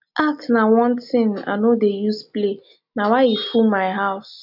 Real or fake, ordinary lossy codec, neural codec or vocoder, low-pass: real; none; none; 5.4 kHz